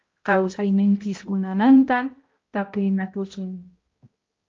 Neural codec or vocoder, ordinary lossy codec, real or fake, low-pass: codec, 16 kHz, 0.5 kbps, X-Codec, HuBERT features, trained on balanced general audio; Opus, 32 kbps; fake; 7.2 kHz